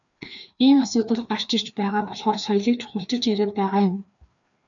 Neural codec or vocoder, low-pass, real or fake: codec, 16 kHz, 2 kbps, FreqCodec, larger model; 7.2 kHz; fake